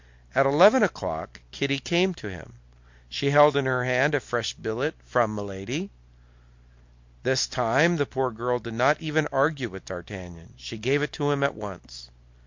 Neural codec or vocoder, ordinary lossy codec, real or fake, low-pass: none; MP3, 48 kbps; real; 7.2 kHz